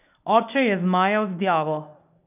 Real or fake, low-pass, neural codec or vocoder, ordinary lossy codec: fake; 3.6 kHz; codec, 24 kHz, 0.9 kbps, WavTokenizer, medium speech release version 1; none